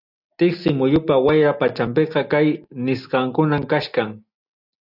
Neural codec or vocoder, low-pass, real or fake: none; 5.4 kHz; real